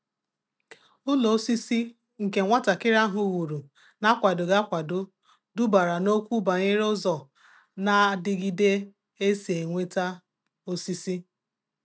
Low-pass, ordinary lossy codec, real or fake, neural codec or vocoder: none; none; real; none